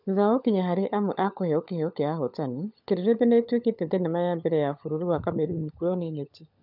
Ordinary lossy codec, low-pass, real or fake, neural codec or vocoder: none; 5.4 kHz; fake; codec, 16 kHz, 16 kbps, FunCodec, trained on LibriTTS, 50 frames a second